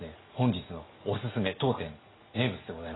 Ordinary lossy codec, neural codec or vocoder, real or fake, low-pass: AAC, 16 kbps; none; real; 7.2 kHz